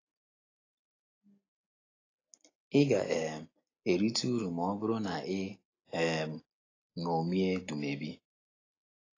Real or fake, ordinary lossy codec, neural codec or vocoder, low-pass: real; AAC, 32 kbps; none; 7.2 kHz